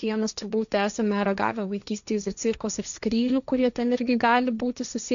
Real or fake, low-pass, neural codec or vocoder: fake; 7.2 kHz; codec, 16 kHz, 1.1 kbps, Voila-Tokenizer